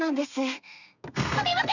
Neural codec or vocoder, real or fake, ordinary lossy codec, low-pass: codec, 32 kHz, 1.9 kbps, SNAC; fake; none; 7.2 kHz